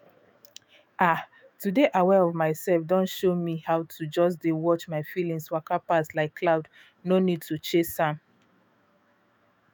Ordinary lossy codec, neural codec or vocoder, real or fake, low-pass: none; autoencoder, 48 kHz, 128 numbers a frame, DAC-VAE, trained on Japanese speech; fake; none